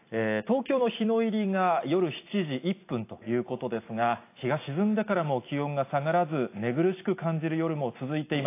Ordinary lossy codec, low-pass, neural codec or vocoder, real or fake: AAC, 24 kbps; 3.6 kHz; none; real